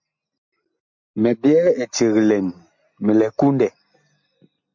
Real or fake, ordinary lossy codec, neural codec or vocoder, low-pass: real; MP3, 48 kbps; none; 7.2 kHz